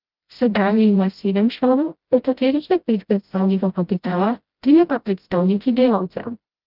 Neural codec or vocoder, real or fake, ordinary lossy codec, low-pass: codec, 16 kHz, 0.5 kbps, FreqCodec, smaller model; fake; Opus, 24 kbps; 5.4 kHz